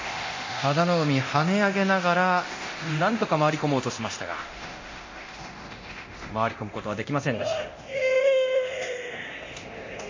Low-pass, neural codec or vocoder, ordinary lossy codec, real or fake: 7.2 kHz; codec, 24 kHz, 0.9 kbps, DualCodec; MP3, 32 kbps; fake